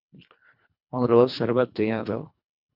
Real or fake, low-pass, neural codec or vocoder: fake; 5.4 kHz; codec, 24 kHz, 1.5 kbps, HILCodec